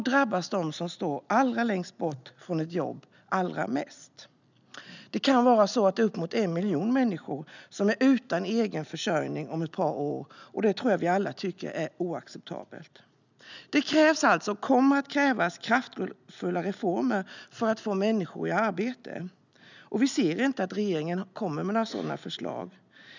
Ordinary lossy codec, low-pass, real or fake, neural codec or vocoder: none; 7.2 kHz; real; none